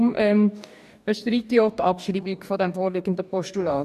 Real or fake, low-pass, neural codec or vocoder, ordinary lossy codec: fake; 14.4 kHz; codec, 44.1 kHz, 2.6 kbps, DAC; none